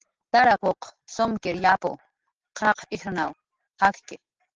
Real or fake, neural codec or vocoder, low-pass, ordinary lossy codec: real; none; 7.2 kHz; Opus, 16 kbps